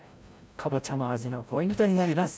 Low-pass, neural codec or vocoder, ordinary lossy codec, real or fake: none; codec, 16 kHz, 0.5 kbps, FreqCodec, larger model; none; fake